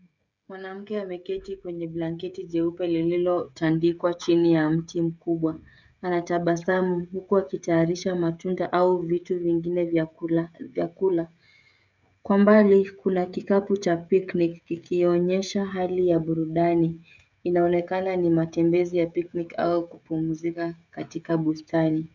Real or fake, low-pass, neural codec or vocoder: fake; 7.2 kHz; codec, 16 kHz, 16 kbps, FreqCodec, smaller model